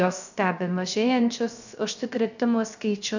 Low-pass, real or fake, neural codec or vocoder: 7.2 kHz; fake; codec, 16 kHz, 0.3 kbps, FocalCodec